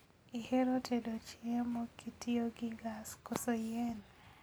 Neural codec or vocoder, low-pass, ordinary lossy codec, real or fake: none; none; none; real